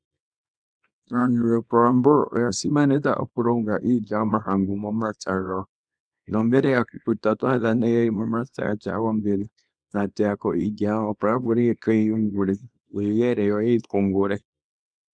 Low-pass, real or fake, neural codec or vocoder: 9.9 kHz; fake; codec, 24 kHz, 0.9 kbps, WavTokenizer, small release